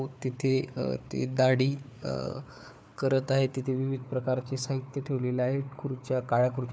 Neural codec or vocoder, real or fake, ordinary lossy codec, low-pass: codec, 16 kHz, 4 kbps, FunCodec, trained on Chinese and English, 50 frames a second; fake; none; none